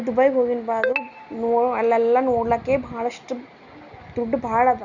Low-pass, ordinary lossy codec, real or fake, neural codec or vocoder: 7.2 kHz; none; real; none